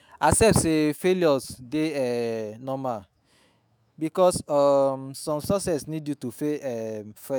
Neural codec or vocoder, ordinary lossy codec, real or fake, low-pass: autoencoder, 48 kHz, 128 numbers a frame, DAC-VAE, trained on Japanese speech; none; fake; none